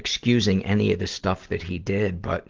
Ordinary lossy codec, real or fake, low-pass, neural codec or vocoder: Opus, 16 kbps; real; 7.2 kHz; none